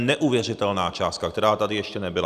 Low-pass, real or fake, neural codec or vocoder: 14.4 kHz; real; none